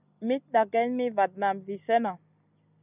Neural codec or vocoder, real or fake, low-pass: none; real; 3.6 kHz